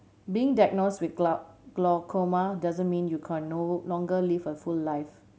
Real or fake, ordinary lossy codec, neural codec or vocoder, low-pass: real; none; none; none